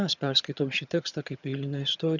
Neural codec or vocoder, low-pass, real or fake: vocoder, 22.05 kHz, 80 mel bands, HiFi-GAN; 7.2 kHz; fake